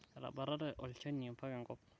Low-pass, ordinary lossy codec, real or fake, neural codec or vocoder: none; none; real; none